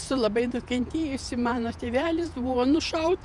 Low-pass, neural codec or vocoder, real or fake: 10.8 kHz; vocoder, 48 kHz, 128 mel bands, Vocos; fake